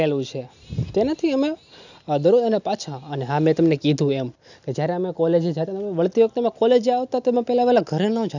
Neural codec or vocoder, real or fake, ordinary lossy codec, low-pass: none; real; none; 7.2 kHz